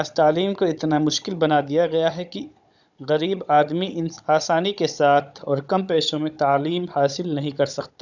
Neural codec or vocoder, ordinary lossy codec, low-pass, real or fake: codec, 16 kHz, 16 kbps, FunCodec, trained on Chinese and English, 50 frames a second; none; 7.2 kHz; fake